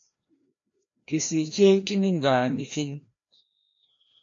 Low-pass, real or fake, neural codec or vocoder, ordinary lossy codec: 7.2 kHz; fake; codec, 16 kHz, 1 kbps, FreqCodec, larger model; AAC, 48 kbps